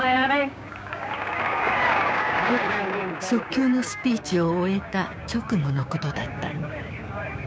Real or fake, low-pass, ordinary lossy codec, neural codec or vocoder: fake; none; none; codec, 16 kHz, 6 kbps, DAC